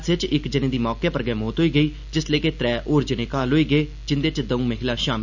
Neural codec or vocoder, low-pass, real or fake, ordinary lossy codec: none; 7.2 kHz; real; none